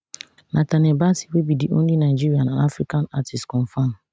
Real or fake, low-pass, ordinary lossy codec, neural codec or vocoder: real; none; none; none